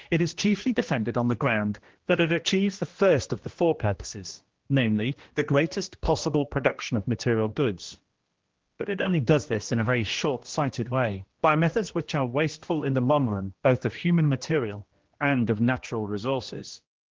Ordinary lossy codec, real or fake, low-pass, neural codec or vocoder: Opus, 16 kbps; fake; 7.2 kHz; codec, 16 kHz, 1 kbps, X-Codec, HuBERT features, trained on general audio